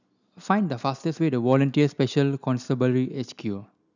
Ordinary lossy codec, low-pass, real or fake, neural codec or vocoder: none; 7.2 kHz; real; none